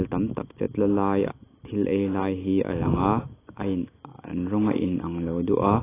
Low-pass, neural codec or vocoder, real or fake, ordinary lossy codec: 3.6 kHz; none; real; AAC, 16 kbps